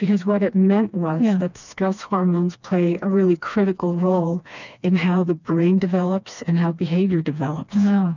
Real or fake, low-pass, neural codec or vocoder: fake; 7.2 kHz; codec, 16 kHz, 2 kbps, FreqCodec, smaller model